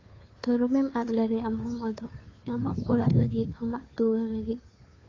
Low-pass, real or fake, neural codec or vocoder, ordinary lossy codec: 7.2 kHz; fake; codec, 16 kHz, 2 kbps, FunCodec, trained on Chinese and English, 25 frames a second; AAC, 32 kbps